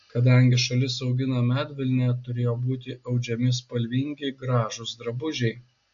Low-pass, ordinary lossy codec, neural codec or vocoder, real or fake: 7.2 kHz; AAC, 64 kbps; none; real